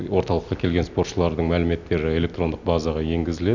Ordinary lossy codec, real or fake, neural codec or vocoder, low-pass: none; real; none; 7.2 kHz